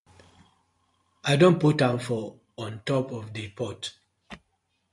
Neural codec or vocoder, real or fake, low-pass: none; real; 10.8 kHz